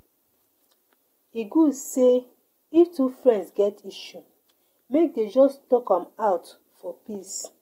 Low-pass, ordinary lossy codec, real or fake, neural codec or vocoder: 19.8 kHz; AAC, 48 kbps; real; none